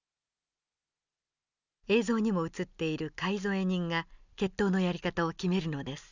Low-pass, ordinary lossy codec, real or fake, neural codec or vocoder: 7.2 kHz; none; real; none